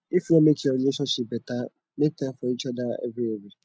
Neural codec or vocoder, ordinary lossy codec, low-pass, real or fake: none; none; none; real